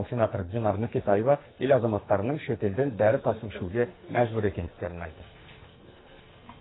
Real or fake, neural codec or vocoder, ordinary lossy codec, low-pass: fake; codec, 44.1 kHz, 2.6 kbps, SNAC; AAC, 16 kbps; 7.2 kHz